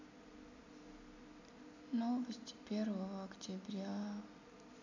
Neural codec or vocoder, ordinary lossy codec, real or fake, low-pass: none; none; real; 7.2 kHz